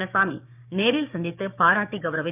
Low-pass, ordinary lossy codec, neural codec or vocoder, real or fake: 3.6 kHz; none; codec, 16 kHz, 6 kbps, DAC; fake